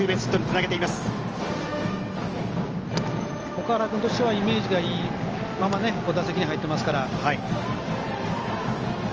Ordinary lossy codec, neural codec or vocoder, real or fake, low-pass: Opus, 24 kbps; none; real; 7.2 kHz